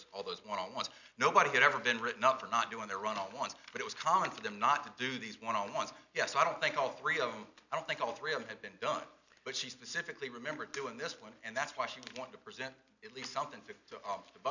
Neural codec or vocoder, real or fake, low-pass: none; real; 7.2 kHz